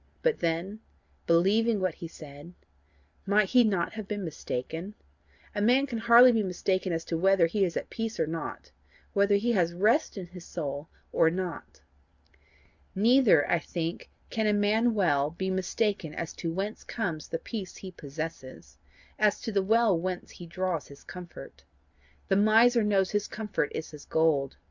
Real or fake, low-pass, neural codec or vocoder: real; 7.2 kHz; none